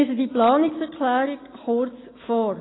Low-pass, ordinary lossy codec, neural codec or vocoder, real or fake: 7.2 kHz; AAC, 16 kbps; none; real